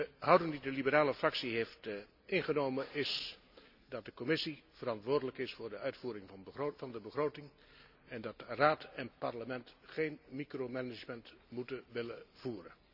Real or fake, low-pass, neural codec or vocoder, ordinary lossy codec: real; 5.4 kHz; none; none